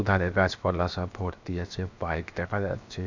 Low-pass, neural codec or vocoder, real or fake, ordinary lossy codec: 7.2 kHz; codec, 16 kHz, 0.7 kbps, FocalCodec; fake; none